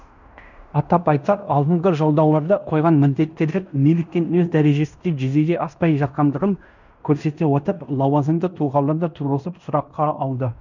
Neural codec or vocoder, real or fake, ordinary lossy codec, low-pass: codec, 16 kHz in and 24 kHz out, 0.9 kbps, LongCat-Audio-Codec, fine tuned four codebook decoder; fake; none; 7.2 kHz